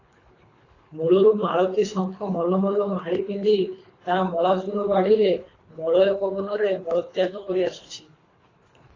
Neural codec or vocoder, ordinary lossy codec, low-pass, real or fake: codec, 24 kHz, 3 kbps, HILCodec; AAC, 32 kbps; 7.2 kHz; fake